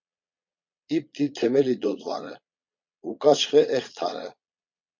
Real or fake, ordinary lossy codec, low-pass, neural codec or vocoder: fake; MP3, 48 kbps; 7.2 kHz; vocoder, 22.05 kHz, 80 mel bands, Vocos